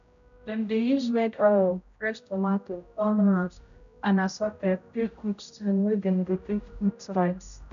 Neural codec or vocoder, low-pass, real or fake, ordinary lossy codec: codec, 16 kHz, 0.5 kbps, X-Codec, HuBERT features, trained on general audio; 7.2 kHz; fake; none